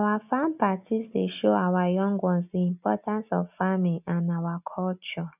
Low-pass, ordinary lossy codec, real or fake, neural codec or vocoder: 3.6 kHz; none; real; none